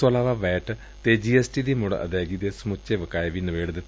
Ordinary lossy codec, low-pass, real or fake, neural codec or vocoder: none; none; real; none